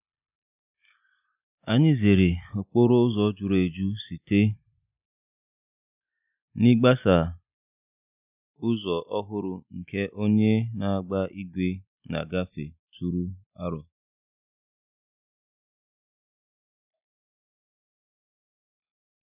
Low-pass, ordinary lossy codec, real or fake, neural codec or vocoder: 3.6 kHz; none; real; none